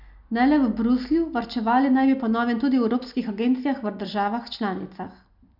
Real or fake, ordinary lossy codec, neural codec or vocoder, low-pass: real; none; none; 5.4 kHz